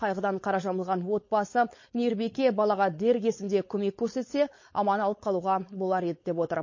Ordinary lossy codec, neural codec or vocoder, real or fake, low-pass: MP3, 32 kbps; codec, 16 kHz, 4.8 kbps, FACodec; fake; 7.2 kHz